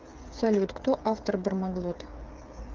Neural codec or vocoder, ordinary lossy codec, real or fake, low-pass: codec, 16 kHz, 8 kbps, FreqCodec, smaller model; Opus, 24 kbps; fake; 7.2 kHz